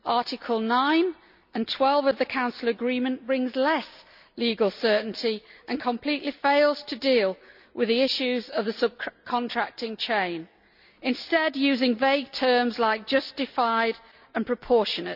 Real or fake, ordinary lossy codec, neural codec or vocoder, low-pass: real; none; none; 5.4 kHz